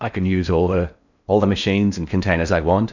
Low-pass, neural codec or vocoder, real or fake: 7.2 kHz; codec, 16 kHz in and 24 kHz out, 0.8 kbps, FocalCodec, streaming, 65536 codes; fake